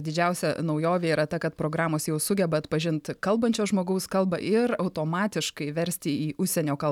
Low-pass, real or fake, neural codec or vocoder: 19.8 kHz; real; none